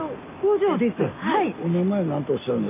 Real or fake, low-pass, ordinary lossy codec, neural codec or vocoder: real; 3.6 kHz; AAC, 32 kbps; none